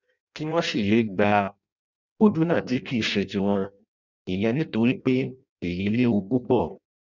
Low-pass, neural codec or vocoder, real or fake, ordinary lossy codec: 7.2 kHz; codec, 16 kHz in and 24 kHz out, 0.6 kbps, FireRedTTS-2 codec; fake; none